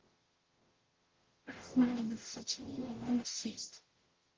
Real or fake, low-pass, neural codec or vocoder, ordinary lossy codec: fake; 7.2 kHz; codec, 44.1 kHz, 0.9 kbps, DAC; Opus, 16 kbps